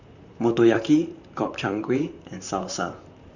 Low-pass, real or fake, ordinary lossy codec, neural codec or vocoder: 7.2 kHz; fake; none; vocoder, 44.1 kHz, 128 mel bands, Pupu-Vocoder